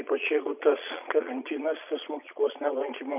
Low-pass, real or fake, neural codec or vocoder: 3.6 kHz; fake; vocoder, 22.05 kHz, 80 mel bands, Vocos